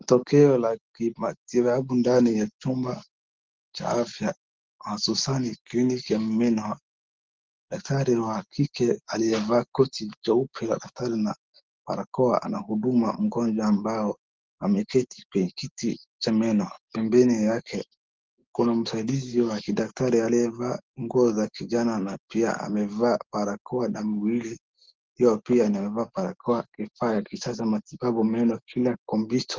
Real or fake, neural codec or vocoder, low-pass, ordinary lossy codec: real; none; 7.2 kHz; Opus, 16 kbps